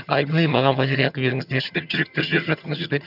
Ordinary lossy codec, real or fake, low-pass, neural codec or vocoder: none; fake; 5.4 kHz; vocoder, 22.05 kHz, 80 mel bands, HiFi-GAN